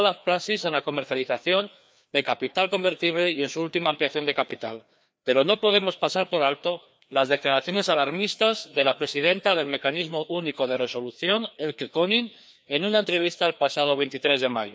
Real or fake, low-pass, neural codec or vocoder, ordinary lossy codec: fake; none; codec, 16 kHz, 2 kbps, FreqCodec, larger model; none